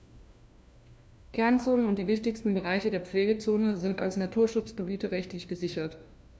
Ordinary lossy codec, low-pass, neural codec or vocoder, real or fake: none; none; codec, 16 kHz, 1 kbps, FunCodec, trained on LibriTTS, 50 frames a second; fake